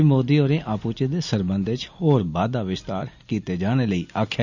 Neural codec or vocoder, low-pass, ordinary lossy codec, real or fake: none; 7.2 kHz; none; real